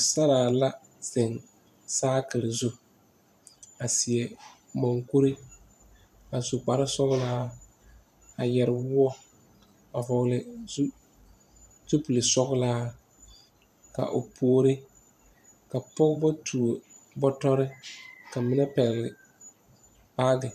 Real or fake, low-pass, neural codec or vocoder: fake; 14.4 kHz; vocoder, 44.1 kHz, 128 mel bands every 512 samples, BigVGAN v2